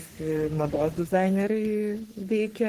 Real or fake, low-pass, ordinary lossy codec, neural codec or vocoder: fake; 14.4 kHz; Opus, 16 kbps; codec, 44.1 kHz, 3.4 kbps, Pupu-Codec